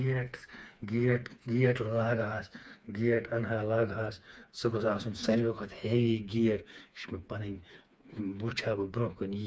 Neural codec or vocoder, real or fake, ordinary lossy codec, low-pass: codec, 16 kHz, 4 kbps, FreqCodec, smaller model; fake; none; none